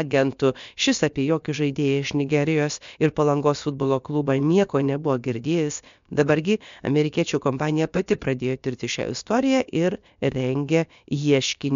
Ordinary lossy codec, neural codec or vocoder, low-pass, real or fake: MP3, 64 kbps; codec, 16 kHz, about 1 kbps, DyCAST, with the encoder's durations; 7.2 kHz; fake